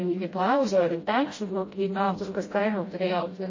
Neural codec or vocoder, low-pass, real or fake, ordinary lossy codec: codec, 16 kHz, 0.5 kbps, FreqCodec, smaller model; 7.2 kHz; fake; MP3, 32 kbps